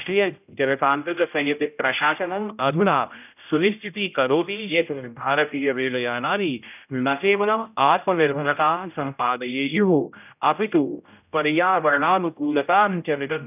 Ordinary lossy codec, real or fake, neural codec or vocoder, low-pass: none; fake; codec, 16 kHz, 0.5 kbps, X-Codec, HuBERT features, trained on general audio; 3.6 kHz